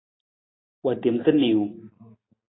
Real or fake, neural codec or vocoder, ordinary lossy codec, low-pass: real; none; AAC, 16 kbps; 7.2 kHz